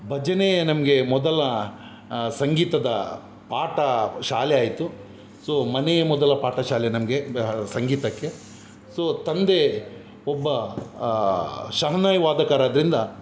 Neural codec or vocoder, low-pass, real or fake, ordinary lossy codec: none; none; real; none